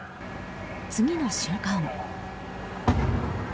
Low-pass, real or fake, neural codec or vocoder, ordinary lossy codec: none; real; none; none